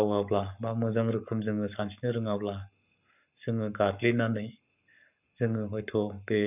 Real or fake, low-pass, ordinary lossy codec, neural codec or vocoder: fake; 3.6 kHz; none; codec, 44.1 kHz, 7.8 kbps, Pupu-Codec